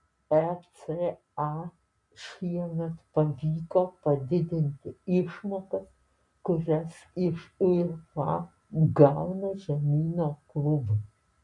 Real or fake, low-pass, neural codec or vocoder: real; 10.8 kHz; none